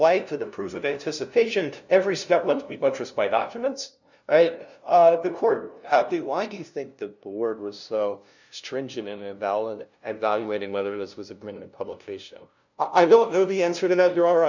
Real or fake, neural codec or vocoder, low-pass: fake; codec, 16 kHz, 0.5 kbps, FunCodec, trained on LibriTTS, 25 frames a second; 7.2 kHz